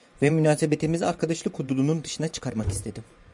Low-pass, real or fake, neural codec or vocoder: 10.8 kHz; fake; vocoder, 24 kHz, 100 mel bands, Vocos